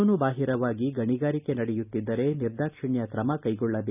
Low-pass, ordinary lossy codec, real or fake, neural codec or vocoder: 3.6 kHz; none; real; none